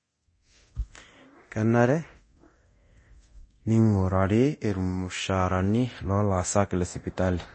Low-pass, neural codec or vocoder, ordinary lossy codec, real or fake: 9.9 kHz; codec, 24 kHz, 0.9 kbps, DualCodec; MP3, 32 kbps; fake